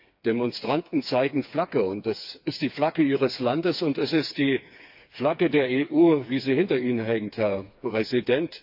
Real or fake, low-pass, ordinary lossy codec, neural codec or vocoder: fake; 5.4 kHz; AAC, 48 kbps; codec, 16 kHz, 4 kbps, FreqCodec, smaller model